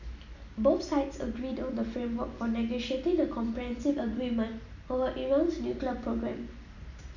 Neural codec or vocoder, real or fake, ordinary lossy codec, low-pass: none; real; none; 7.2 kHz